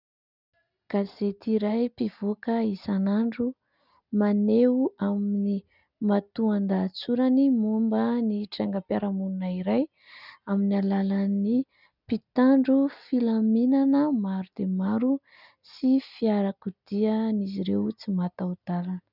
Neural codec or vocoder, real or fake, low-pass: none; real; 5.4 kHz